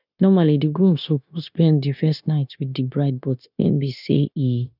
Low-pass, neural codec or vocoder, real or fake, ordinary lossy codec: 5.4 kHz; codec, 16 kHz, 0.9 kbps, LongCat-Audio-Codec; fake; none